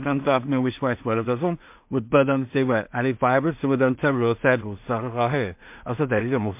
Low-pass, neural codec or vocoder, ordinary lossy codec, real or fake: 3.6 kHz; codec, 16 kHz in and 24 kHz out, 0.4 kbps, LongCat-Audio-Codec, two codebook decoder; MP3, 32 kbps; fake